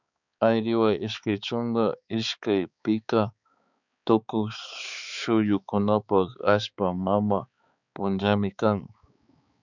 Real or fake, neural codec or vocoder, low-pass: fake; codec, 16 kHz, 4 kbps, X-Codec, HuBERT features, trained on balanced general audio; 7.2 kHz